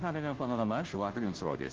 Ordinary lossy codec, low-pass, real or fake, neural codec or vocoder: Opus, 16 kbps; 7.2 kHz; fake; codec, 16 kHz, 0.5 kbps, FunCodec, trained on Chinese and English, 25 frames a second